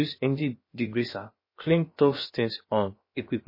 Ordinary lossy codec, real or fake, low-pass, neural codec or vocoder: MP3, 24 kbps; fake; 5.4 kHz; codec, 16 kHz, about 1 kbps, DyCAST, with the encoder's durations